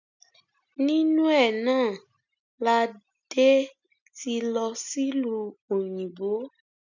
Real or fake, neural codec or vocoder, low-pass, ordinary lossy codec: real; none; 7.2 kHz; none